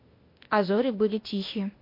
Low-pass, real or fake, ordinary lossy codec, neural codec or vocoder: 5.4 kHz; fake; MP3, 32 kbps; codec, 16 kHz, 0.8 kbps, ZipCodec